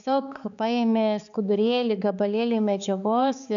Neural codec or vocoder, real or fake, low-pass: codec, 16 kHz, 4 kbps, X-Codec, HuBERT features, trained on balanced general audio; fake; 7.2 kHz